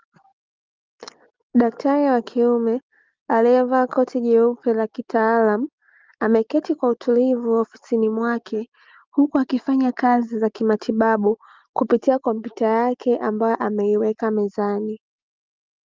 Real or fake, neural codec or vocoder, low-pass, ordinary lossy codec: real; none; 7.2 kHz; Opus, 32 kbps